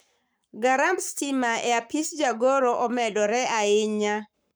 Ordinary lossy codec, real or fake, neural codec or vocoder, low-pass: none; fake; codec, 44.1 kHz, 7.8 kbps, Pupu-Codec; none